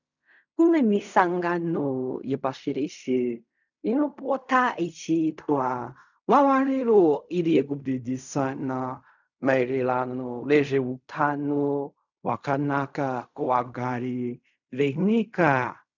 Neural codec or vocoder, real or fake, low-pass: codec, 16 kHz in and 24 kHz out, 0.4 kbps, LongCat-Audio-Codec, fine tuned four codebook decoder; fake; 7.2 kHz